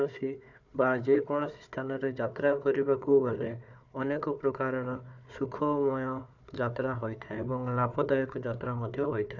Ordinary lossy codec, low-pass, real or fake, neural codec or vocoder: none; 7.2 kHz; fake; codec, 16 kHz, 4 kbps, FunCodec, trained on Chinese and English, 50 frames a second